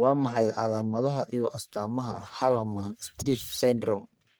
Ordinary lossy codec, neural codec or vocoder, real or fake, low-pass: none; codec, 44.1 kHz, 1.7 kbps, Pupu-Codec; fake; none